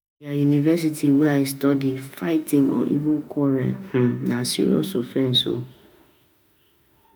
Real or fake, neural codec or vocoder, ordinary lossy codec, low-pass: fake; autoencoder, 48 kHz, 32 numbers a frame, DAC-VAE, trained on Japanese speech; none; none